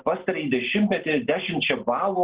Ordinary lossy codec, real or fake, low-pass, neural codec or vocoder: Opus, 16 kbps; real; 3.6 kHz; none